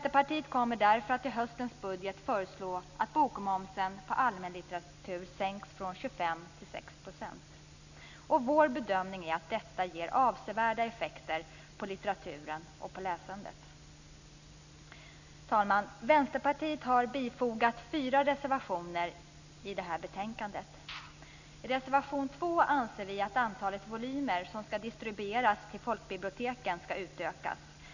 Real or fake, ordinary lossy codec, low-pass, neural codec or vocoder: real; none; 7.2 kHz; none